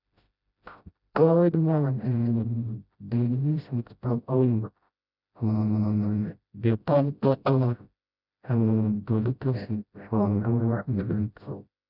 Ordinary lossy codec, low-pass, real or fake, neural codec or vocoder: none; 5.4 kHz; fake; codec, 16 kHz, 0.5 kbps, FreqCodec, smaller model